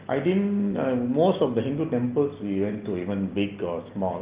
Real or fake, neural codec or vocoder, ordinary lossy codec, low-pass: real; none; Opus, 32 kbps; 3.6 kHz